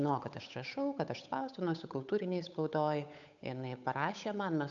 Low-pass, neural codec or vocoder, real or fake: 7.2 kHz; codec, 16 kHz, 8 kbps, FunCodec, trained on Chinese and English, 25 frames a second; fake